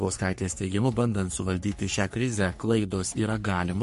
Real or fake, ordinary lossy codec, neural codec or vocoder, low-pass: fake; MP3, 48 kbps; codec, 44.1 kHz, 3.4 kbps, Pupu-Codec; 14.4 kHz